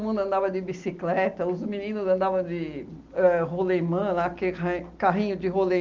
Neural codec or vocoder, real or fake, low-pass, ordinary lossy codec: none; real; 7.2 kHz; Opus, 24 kbps